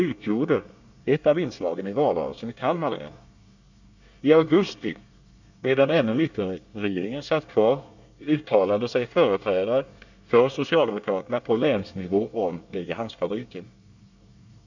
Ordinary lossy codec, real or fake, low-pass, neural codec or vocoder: none; fake; 7.2 kHz; codec, 24 kHz, 1 kbps, SNAC